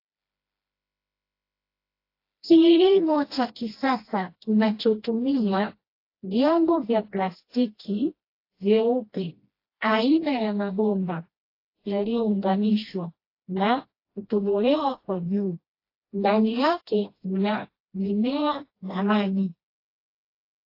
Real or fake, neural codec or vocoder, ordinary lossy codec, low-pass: fake; codec, 16 kHz, 1 kbps, FreqCodec, smaller model; AAC, 32 kbps; 5.4 kHz